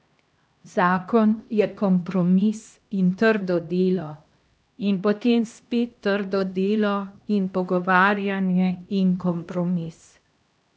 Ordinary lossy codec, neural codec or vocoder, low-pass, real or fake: none; codec, 16 kHz, 1 kbps, X-Codec, HuBERT features, trained on LibriSpeech; none; fake